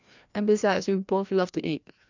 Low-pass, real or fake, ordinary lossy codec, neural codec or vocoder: 7.2 kHz; fake; none; codec, 16 kHz, 1 kbps, FreqCodec, larger model